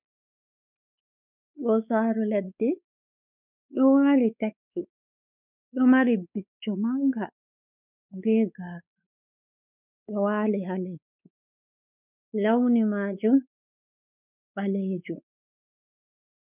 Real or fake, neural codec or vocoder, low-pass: fake; codec, 16 kHz, 4 kbps, X-Codec, WavLM features, trained on Multilingual LibriSpeech; 3.6 kHz